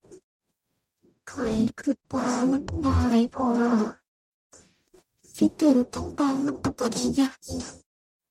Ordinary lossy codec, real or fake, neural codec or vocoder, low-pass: MP3, 64 kbps; fake; codec, 44.1 kHz, 0.9 kbps, DAC; 19.8 kHz